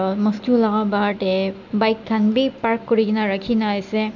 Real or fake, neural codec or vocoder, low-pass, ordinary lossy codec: real; none; 7.2 kHz; none